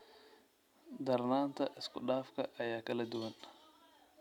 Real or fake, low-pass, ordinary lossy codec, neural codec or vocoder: real; 19.8 kHz; none; none